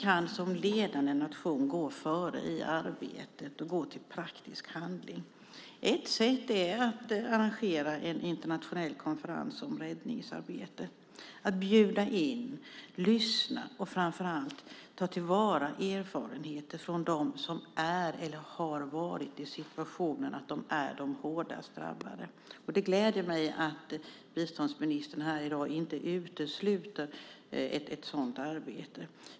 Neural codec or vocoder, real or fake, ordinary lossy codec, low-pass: none; real; none; none